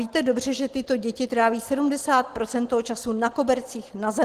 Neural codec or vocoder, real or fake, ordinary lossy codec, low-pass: none; real; Opus, 16 kbps; 14.4 kHz